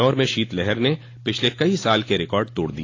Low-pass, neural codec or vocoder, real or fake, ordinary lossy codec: 7.2 kHz; none; real; AAC, 32 kbps